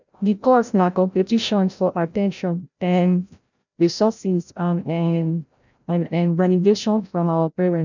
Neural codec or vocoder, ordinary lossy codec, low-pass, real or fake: codec, 16 kHz, 0.5 kbps, FreqCodec, larger model; none; 7.2 kHz; fake